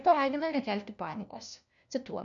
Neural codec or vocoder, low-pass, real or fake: codec, 16 kHz, 1 kbps, FunCodec, trained on LibriTTS, 50 frames a second; 7.2 kHz; fake